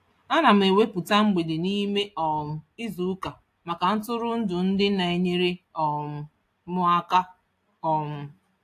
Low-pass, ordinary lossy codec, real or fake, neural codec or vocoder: 14.4 kHz; AAC, 64 kbps; real; none